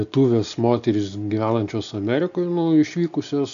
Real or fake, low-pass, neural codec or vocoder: real; 7.2 kHz; none